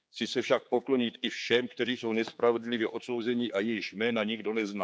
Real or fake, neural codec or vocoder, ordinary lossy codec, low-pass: fake; codec, 16 kHz, 4 kbps, X-Codec, HuBERT features, trained on general audio; none; none